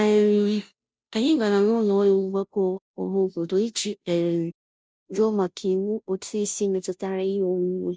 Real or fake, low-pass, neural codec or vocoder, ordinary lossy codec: fake; none; codec, 16 kHz, 0.5 kbps, FunCodec, trained on Chinese and English, 25 frames a second; none